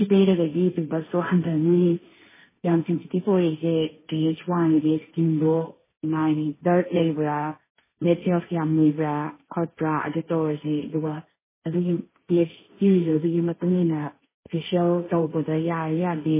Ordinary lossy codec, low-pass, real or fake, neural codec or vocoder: MP3, 16 kbps; 3.6 kHz; fake; codec, 16 kHz, 1.1 kbps, Voila-Tokenizer